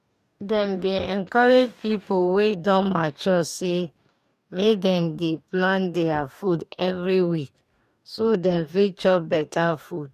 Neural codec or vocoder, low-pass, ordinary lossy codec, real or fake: codec, 44.1 kHz, 2.6 kbps, DAC; 14.4 kHz; none; fake